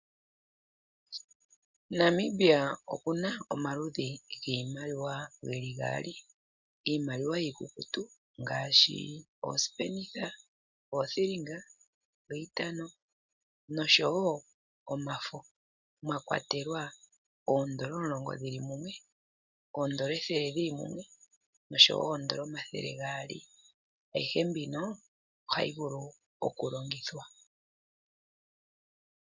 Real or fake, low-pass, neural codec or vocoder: real; 7.2 kHz; none